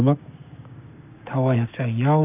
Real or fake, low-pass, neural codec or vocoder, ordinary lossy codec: fake; 3.6 kHz; codec, 16 kHz, 6 kbps, DAC; none